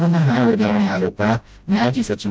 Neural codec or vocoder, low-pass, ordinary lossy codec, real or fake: codec, 16 kHz, 0.5 kbps, FreqCodec, smaller model; none; none; fake